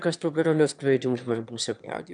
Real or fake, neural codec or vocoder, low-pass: fake; autoencoder, 22.05 kHz, a latent of 192 numbers a frame, VITS, trained on one speaker; 9.9 kHz